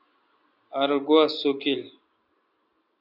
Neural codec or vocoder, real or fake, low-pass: none; real; 5.4 kHz